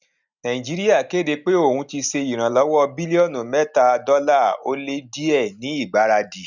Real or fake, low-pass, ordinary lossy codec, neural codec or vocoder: real; 7.2 kHz; none; none